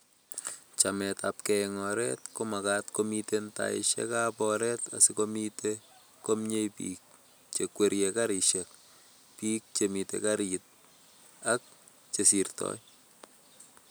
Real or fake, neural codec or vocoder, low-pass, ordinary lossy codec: real; none; none; none